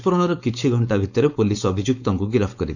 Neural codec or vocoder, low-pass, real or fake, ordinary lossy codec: codec, 16 kHz, 4.8 kbps, FACodec; 7.2 kHz; fake; none